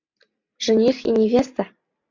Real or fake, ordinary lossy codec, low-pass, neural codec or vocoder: real; MP3, 64 kbps; 7.2 kHz; none